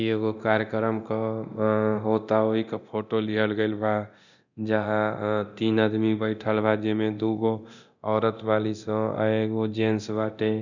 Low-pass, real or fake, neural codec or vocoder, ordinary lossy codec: 7.2 kHz; fake; codec, 24 kHz, 0.9 kbps, DualCodec; none